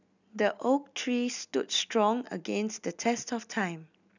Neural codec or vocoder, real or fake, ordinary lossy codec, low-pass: none; real; none; 7.2 kHz